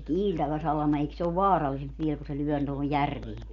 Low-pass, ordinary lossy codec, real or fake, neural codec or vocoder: 7.2 kHz; none; real; none